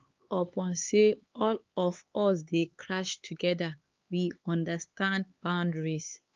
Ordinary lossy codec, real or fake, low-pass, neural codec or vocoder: Opus, 32 kbps; fake; 7.2 kHz; codec, 16 kHz, 4 kbps, X-Codec, HuBERT features, trained on LibriSpeech